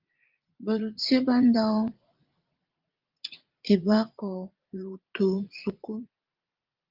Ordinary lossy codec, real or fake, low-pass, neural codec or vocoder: Opus, 24 kbps; fake; 5.4 kHz; vocoder, 44.1 kHz, 80 mel bands, Vocos